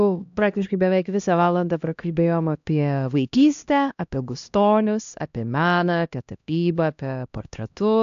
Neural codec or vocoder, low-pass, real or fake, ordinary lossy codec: codec, 16 kHz, 1 kbps, X-Codec, HuBERT features, trained on LibriSpeech; 7.2 kHz; fake; AAC, 64 kbps